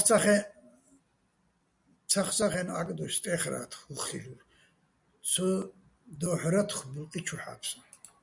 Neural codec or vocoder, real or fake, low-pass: none; real; 10.8 kHz